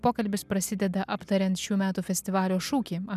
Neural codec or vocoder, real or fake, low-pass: none; real; 14.4 kHz